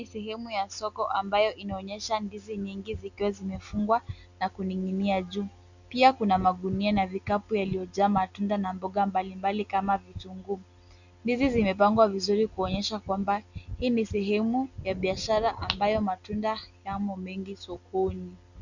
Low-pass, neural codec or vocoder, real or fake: 7.2 kHz; none; real